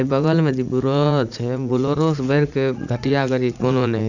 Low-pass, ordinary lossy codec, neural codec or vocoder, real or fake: 7.2 kHz; none; vocoder, 44.1 kHz, 80 mel bands, Vocos; fake